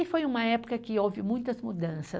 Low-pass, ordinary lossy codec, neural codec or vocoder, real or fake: none; none; none; real